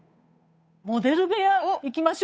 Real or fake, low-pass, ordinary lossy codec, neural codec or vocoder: fake; none; none; codec, 16 kHz, 8 kbps, FunCodec, trained on Chinese and English, 25 frames a second